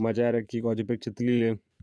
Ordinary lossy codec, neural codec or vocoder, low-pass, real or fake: none; vocoder, 22.05 kHz, 80 mel bands, Vocos; none; fake